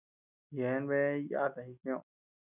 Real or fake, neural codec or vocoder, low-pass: real; none; 3.6 kHz